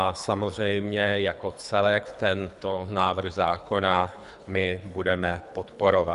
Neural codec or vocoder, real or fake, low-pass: codec, 24 kHz, 3 kbps, HILCodec; fake; 10.8 kHz